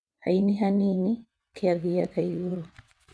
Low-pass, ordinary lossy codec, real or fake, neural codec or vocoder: none; none; fake; vocoder, 22.05 kHz, 80 mel bands, WaveNeXt